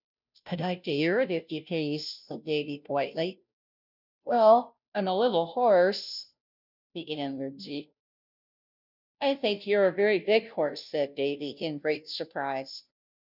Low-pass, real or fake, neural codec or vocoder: 5.4 kHz; fake; codec, 16 kHz, 0.5 kbps, FunCodec, trained on Chinese and English, 25 frames a second